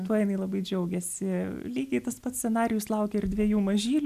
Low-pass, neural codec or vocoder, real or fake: 14.4 kHz; none; real